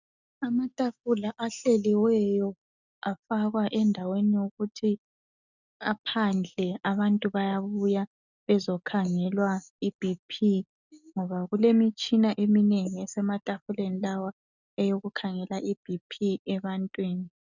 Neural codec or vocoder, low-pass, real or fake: none; 7.2 kHz; real